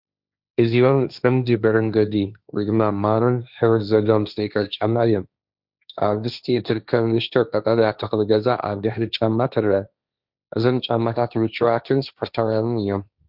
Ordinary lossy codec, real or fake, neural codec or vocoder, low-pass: Opus, 64 kbps; fake; codec, 16 kHz, 1.1 kbps, Voila-Tokenizer; 5.4 kHz